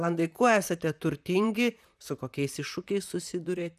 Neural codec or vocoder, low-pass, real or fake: vocoder, 44.1 kHz, 128 mel bands, Pupu-Vocoder; 14.4 kHz; fake